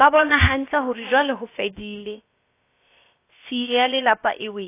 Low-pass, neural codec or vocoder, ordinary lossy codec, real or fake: 3.6 kHz; codec, 16 kHz, about 1 kbps, DyCAST, with the encoder's durations; AAC, 24 kbps; fake